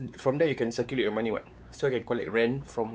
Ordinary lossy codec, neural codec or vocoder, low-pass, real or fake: none; codec, 16 kHz, 4 kbps, X-Codec, WavLM features, trained on Multilingual LibriSpeech; none; fake